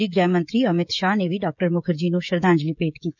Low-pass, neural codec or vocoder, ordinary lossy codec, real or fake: 7.2 kHz; codec, 16 kHz, 16 kbps, FreqCodec, smaller model; none; fake